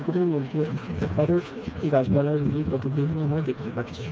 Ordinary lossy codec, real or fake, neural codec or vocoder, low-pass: none; fake; codec, 16 kHz, 2 kbps, FreqCodec, smaller model; none